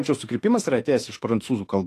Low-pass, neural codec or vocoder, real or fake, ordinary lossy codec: 14.4 kHz; autoencoder, 48 kHz, 32 numbers a frame, DAC-VAE, trained on Japanese speech; fake; AAC, 48 kbps